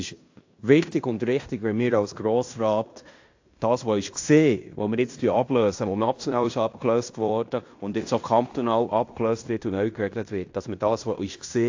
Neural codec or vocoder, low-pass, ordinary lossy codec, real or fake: codec, 16 kHz in and 24 kHz out, 0.9 kbps, LongCat-Audio-Codec, fine tuned four codebook decoder; 7.2 kHz; AAC, 48 kbps; fake